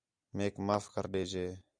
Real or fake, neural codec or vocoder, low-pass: real; none; 9.9 kHz